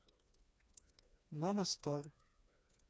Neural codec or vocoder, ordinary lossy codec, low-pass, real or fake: codec, 16 kHz, 2 kbps, FreqCodec, smaller model; none; none; fake